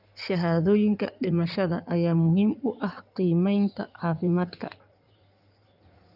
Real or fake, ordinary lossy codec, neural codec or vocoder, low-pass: fake; none; codec, 16 kHz in and 24 kHz out, 2.2 kbps, FireRedTTS-2 codec; 5.4 kHz